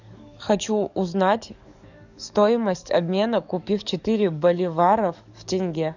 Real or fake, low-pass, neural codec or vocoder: fake; 7.2 kHz; codec, 44.1 kHz, 7.8 kbps, DAC